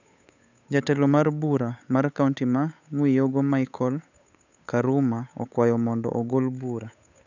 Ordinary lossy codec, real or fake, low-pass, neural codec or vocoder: none; fake; 7.2 kHz; codec, 16 kHz, 8 kbps, FunCodec, trained on Chinese and English, 25 frames a second